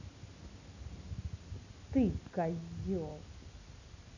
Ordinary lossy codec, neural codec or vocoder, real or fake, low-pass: none; none; real; 7.2 kHz